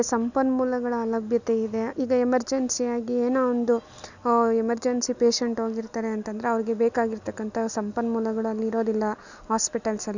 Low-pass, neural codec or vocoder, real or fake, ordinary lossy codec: 7.2 kHz; none; real; none